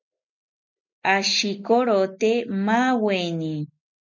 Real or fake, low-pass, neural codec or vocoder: real; 7.2 kHz; none